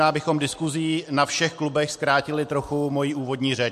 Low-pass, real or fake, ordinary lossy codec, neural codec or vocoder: 14.4 kHz; real; MP3, 64 kbps; none